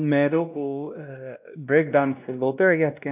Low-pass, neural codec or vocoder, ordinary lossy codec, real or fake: 3.6 kHz; codec, 16 kHz, 1 kbps, X-Codec, WavLM features, trained on Multilingual LibriSpeech; none; fake